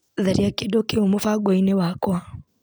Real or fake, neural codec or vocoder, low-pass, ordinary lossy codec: real; none; none; none